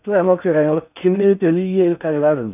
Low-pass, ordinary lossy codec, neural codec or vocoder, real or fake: 3.6 kHz; none; codec, 16 kHz in and 24 kHz out, 0.6 kbps, FocalCodec, streaming, 4096 codes; fake